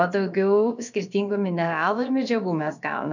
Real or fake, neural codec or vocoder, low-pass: fake; codec, 16 kHz, about 1 kbps, DyCAST, with the encoder's durations; 7.2 kHz